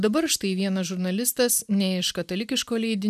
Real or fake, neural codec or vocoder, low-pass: real; none; 14.4 kHz